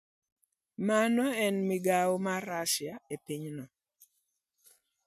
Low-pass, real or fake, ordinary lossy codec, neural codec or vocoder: 14.4 kHz; real; none; none